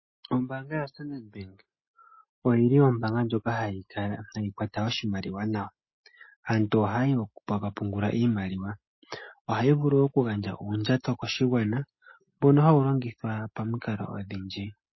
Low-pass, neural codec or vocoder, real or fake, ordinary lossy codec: 7.2 kHz; none; real; MP3, 24 kbps